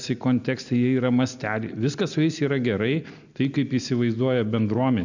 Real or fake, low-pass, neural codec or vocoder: real; 7.2 kHz; none